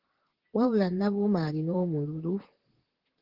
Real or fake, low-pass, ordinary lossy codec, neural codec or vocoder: fake; 5.4 kHz; Opus, 16 kbps; vocoder, 44.1 kHz, 128 mel bands, Pupu-Vocoder